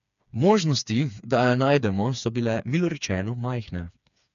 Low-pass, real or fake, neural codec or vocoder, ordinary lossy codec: 7.2 kHz; fake; codec, 16 kHz, 4 kbps, FreqCodec, smaller model; none